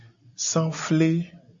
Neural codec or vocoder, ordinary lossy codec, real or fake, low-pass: none; AAC, 64 kbps; real; 7.2 kHz